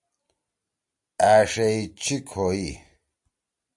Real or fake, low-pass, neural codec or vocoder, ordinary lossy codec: real; 10.8 kHz; none; AAC, 64 kbps